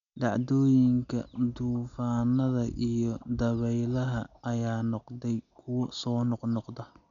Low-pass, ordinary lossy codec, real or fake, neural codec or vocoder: 7.2 kHz; none; real; none